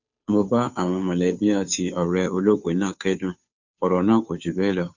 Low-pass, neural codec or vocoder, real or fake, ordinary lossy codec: 7.2 kHz; codec, 16 kHz, 2 kbps, FunCodec, trained on Chinese and English, 25 frames a second; fake; none